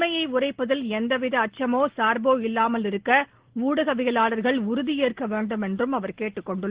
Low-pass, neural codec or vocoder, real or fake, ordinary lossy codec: 3.6 kHz; none; real; Opus, 16 kbps